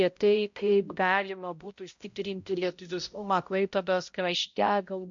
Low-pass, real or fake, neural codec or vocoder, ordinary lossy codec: 7.2 kHz; fake; codec, 16 kHz, 0.5 kbps, X-Codec, HuBERT features, trained on balanced general audio; MP3, 48 kbps